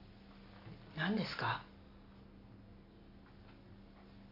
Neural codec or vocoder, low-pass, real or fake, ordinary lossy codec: none; 5.4 kHz; real; AAC, 32 kbps